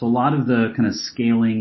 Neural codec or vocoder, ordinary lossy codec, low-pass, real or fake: none; MP3, 24 kbps; 7.2 kHz; real